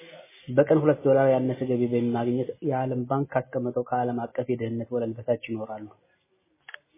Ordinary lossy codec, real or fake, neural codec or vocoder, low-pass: MP3, 16 kbps; real; none; 3.6 kHz